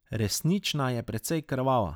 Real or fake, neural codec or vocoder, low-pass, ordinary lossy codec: real; none; none; none